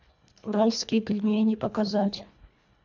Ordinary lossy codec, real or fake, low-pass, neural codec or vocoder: none; fake; 7.2 kHz; codec, 24 kHz, 1.5 kbps, HILCodec